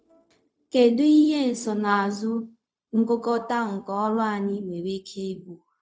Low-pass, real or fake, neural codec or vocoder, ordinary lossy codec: none; fake; codec, 16 kHz, 0.4 kbps, LongCat-Audio-Codec; none